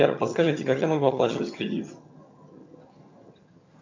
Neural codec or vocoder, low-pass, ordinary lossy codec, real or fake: vocoder, 22.05 kHz, 80 mel bands, HiFi-GAN; 7.2 kHz; MP3, 64 kbps; fake